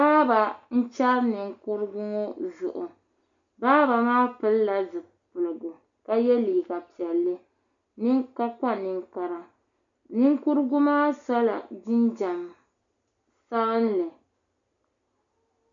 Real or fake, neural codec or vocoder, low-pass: real; none; 7.2 kHz